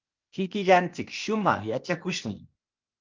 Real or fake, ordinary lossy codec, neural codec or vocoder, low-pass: fake; Opus, 24 kbps; codec, 16 kHz, 0.8 kbps, ZipCodec; 7.2 kHz